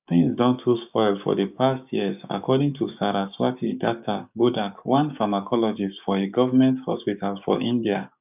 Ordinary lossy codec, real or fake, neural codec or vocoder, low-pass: none; fake; codec, 16 kHz, 6 kbps, DAC; 3.6 kHz